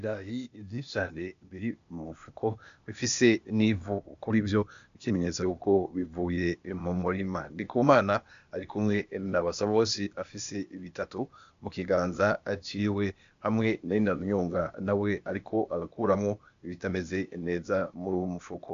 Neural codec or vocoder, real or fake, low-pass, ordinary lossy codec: codec, 16 kHz, 0.8 kbps, ZipCodec; fake; 7.2 kHz; MP3, 64 kbps